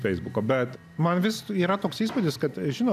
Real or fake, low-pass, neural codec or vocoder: real; 14.4 kHz; none